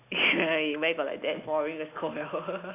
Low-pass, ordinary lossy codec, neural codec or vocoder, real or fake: 3.6 kHz; AAC, 24 kbps; none; real